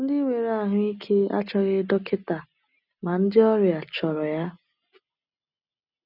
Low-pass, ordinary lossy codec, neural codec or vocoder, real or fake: 5.4 kHz; none; none; real